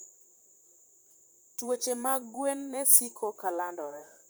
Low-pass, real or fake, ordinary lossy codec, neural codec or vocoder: none; fake; none; vocoder, 44.1 kHz, 128 mel bands, Pupu-Vocoder